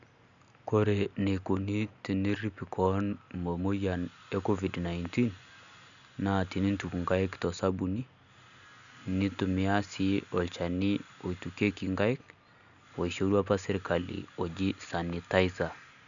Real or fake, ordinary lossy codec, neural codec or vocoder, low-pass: real; none; none; 7.2 kHz